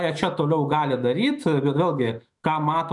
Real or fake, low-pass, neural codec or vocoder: fake; 10.8 kHz; autoencoder, 48 kHz, 128 numbers a frame, DAC-VAE, trained on Japanese speech